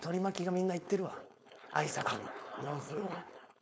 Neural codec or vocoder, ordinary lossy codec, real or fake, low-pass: codec, 16 kHz, 4.8 kbps, FACodec; none; fake; none